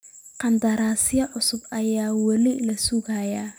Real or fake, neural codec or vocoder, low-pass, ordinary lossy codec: real; none; none; none